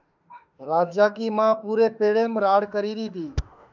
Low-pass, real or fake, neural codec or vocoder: 7.2 kHz; fake; autoencoder, 48 kHz, 32 numbers a frame, DAC-VAE, trained on Japanese speech